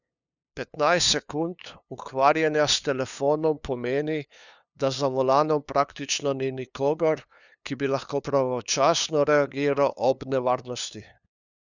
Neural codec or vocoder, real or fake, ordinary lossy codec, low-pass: codec, 16 kHz, 2 kbps, FunCodec, trained on LibriTTS, 25 frames a second; fake; none; 7.2 kHz